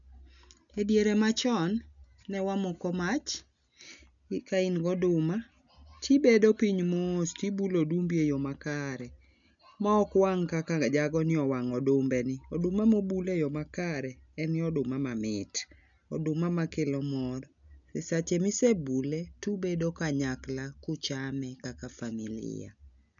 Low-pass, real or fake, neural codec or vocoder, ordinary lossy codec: 7.2 kHz; real; none; none